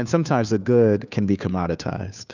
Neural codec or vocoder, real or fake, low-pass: codec, 16 kHz, 2 kbps, FunCodec, trained on Chinese and English, 25 frames a second; fake; 7.2 kHz